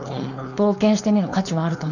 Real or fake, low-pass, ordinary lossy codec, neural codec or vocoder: fake; 7.2 kHz; none; codec, 16 kHz, 4.8 kbps, FACodec